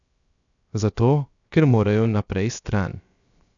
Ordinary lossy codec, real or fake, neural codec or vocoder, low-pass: none; fake; codec, 16 kHz, 0.7 kbps, FocalCodec; 7.2 kHz